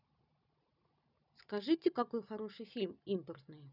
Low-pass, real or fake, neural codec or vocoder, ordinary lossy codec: 5.4 kHz; real; none; none